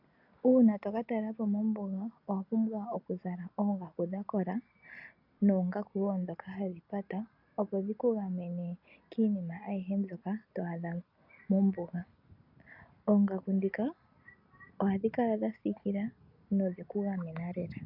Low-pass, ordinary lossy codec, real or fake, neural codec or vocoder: 5.4 kHz; MP3, 48 kbps; real; none